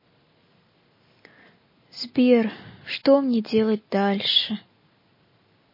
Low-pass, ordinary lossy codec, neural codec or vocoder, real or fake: 5.4 kHz; MP3, 24 kbps; none; real